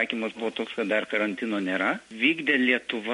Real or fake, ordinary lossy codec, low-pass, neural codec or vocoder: real; MP3, 48 kbps; 10.8 kHz; none